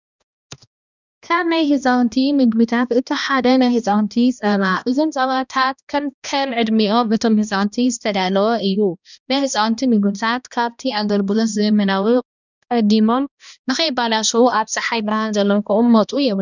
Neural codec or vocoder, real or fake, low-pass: codec, 16 kHz, 1 kbps, X-Codec, HuBERT features, trained on balanced general audio; fake; 7.2 kHz